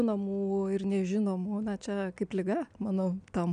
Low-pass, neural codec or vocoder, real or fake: 9.9 kHz; none; real